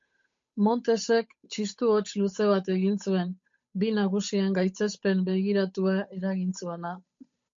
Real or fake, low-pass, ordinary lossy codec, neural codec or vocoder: fake; 7.2 kHz; MP3, 48 kbps; codec, 16 kHz, 8 kbps, FunCodec, trained on Chinese and English, 25 frames a second